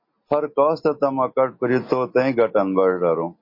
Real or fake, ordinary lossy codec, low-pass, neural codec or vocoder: real; MP3, 32 kbps; 5.4 kHz; none